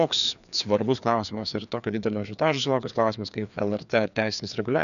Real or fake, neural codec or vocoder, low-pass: fake; codec, 16 kHz, 2 kbps, FreqCodec, larger model; 7.2 kHz